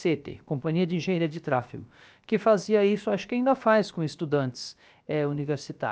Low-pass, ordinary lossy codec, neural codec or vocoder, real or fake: none; none; codec, 16 kHz, about 1 kbps, DyCAST, with the encoder's durations; fake